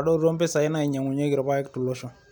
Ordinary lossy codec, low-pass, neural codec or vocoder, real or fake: none; 19.8 kHz; none; real